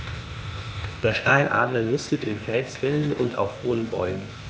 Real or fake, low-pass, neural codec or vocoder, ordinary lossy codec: fake; none; codec, 16 kHz, 0.8 kbps, ZipCodec; none